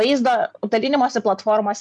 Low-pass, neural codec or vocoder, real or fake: 10.8 kHz; none; real